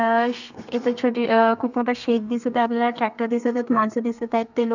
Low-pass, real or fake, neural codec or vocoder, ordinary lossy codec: 7.2 kHz; fake; codec, 32 kHz, 1.9 kbps, SNAC; none